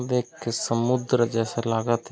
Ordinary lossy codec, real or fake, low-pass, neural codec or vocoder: none; real; none; none